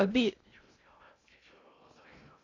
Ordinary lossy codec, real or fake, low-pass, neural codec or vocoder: AAC, 32 kbps; fake; 7.2 kHz; codec, 16 kHz in and 24 kHz out, 0.6 kbps, FocalCodec, streaming, 2048 codes